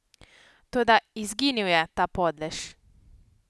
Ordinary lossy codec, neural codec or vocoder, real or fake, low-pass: none; none; real; none